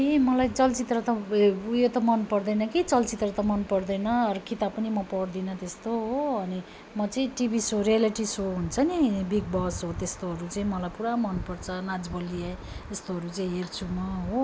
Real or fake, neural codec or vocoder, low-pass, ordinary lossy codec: real; none; none; none